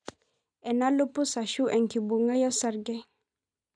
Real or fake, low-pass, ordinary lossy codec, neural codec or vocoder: real; 9.9 kHz; none; none